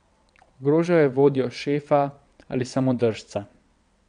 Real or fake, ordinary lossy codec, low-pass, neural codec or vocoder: fake; none; 9.9 kHz; vocoder, 22.05 kHz, 80 mel bands, WaveNeXt